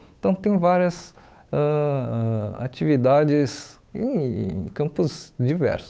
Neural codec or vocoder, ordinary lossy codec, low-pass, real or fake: codec, 16 kHz, 8 kbps, FunCodec, trained on Chinese and English, 25 frames a second; none; none; fake